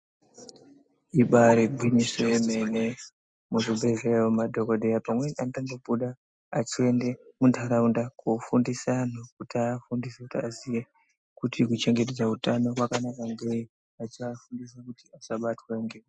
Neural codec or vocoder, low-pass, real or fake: none; 9.9 kHz; real